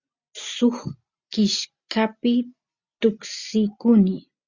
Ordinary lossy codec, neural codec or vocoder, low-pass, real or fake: Opus, 64 kbps; none; 7.2 kHz; real